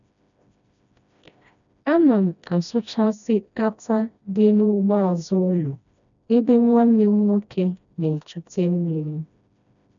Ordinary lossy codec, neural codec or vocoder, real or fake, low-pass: none; codec, 16 kHz, 1 kbps, FreqCodec, smaller model; fake; 7.2 kHz